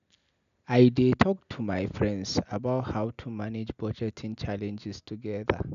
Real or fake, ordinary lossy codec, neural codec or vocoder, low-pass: real; none; none; 7.2 kHz